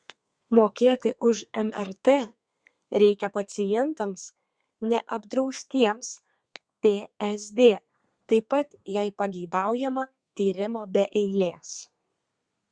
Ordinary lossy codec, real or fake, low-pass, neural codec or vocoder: Opus, 64 kbps; fake; 9.9 kHz; codec, 32 kHz, 1.9 kbps, SNAC